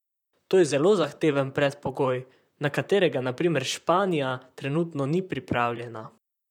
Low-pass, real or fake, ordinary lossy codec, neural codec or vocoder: 19.8 kHz; fake; none; vocoder, 44.1 kHz, 128 mel bands, Pupu-Vocoder